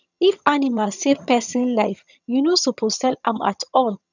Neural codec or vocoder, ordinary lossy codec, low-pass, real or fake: vocoder, 22.05 kHz, 80 mel bands, HiFi-GAN; none; 7.2 kHz; fake